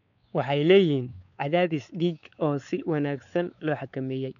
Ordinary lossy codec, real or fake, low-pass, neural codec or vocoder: none; fake; 7.2 kHz; codec, 16 kHz, 4 kbps, X-Codec, WavLM features, trained on Multilingual LibriSpeech